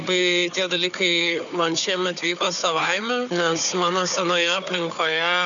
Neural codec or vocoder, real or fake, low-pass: codec, 16 kHz, 4 kbps, FunCodec, trained on Chinese and English, 50 frames a second; fake; 7.2 kHz